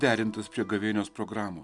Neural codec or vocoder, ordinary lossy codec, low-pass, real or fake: none; Opus, 64 kbps; 10.8 kHz; real